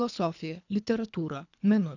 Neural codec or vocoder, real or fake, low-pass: codec, 24 kHz, 3 kbps, HILCodec; fake; 7.2 kHz